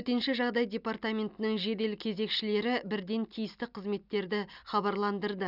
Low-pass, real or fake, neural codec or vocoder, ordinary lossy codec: 5.4 kHz; real; none; none